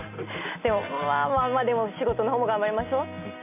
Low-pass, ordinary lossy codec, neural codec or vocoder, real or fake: 3.6 kHz; none; none; real